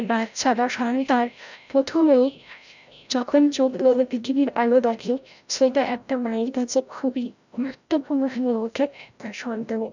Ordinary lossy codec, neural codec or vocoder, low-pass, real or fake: none; codec, 16 kHz, 0.5 kbps, FreqCodec, larger model; 7.2 kHz; fake